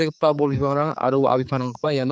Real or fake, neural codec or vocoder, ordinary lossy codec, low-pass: fake; codec, 16 kHz, 4 kbps, X-Codec, HuBERT features, trained on general audio; none; none